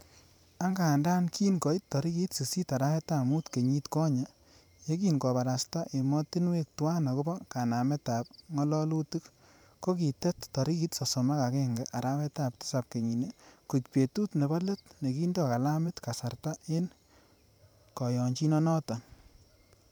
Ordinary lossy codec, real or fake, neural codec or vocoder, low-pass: none; fake; vocoder, 44.1 kHz, 128 mel bands every 512 samples, BigVGAN v2; none